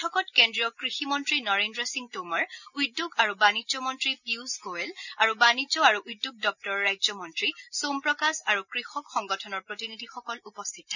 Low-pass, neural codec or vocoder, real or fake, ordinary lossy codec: 7.2 kHz; none; real; none